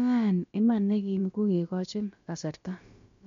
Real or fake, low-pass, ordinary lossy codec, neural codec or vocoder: fake; 7.2 kHz; MP3, 48 kbps; codec, 16 kHz, about 1 kbps, DyCAST, with the encoder's durations